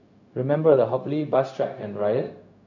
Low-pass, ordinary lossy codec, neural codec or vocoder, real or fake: 7.2 kHz; none; codec, 16 kHz, 0.4 kbps, LongCat-Audio-Codec; fake